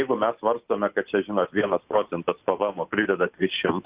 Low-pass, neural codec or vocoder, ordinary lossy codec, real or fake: 3.6 kHz; none; Opus, 64 kbps; real